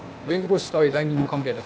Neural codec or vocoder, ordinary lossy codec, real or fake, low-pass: codec, 16 kHz, 0.8 kbps, ZipCodec; none; fake; none